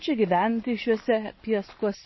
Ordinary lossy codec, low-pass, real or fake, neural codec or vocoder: MP3, 24 kbps; 7.2 kHz; real; none